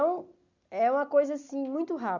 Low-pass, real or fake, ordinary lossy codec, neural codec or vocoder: 7.2 kHz; real; none; none